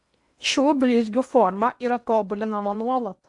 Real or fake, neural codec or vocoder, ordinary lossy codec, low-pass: fake; codec, 16 kHz in and 24 kHz out, 0.8 kbps, FocalCodec, streaming, 65536 codes; AAC, 64 kbps; 10.8 kHz